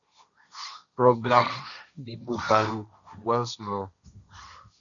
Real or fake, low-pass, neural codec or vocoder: fake; 7.2 kHz; codec, 16 kHz, 1.1 kbps, Voila-Tokenizer